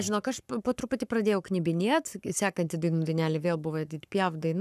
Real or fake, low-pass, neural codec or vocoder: fake; 14.4 kHz; codec, 44.1 kHz, 7.8 kbps, Pupu-Codec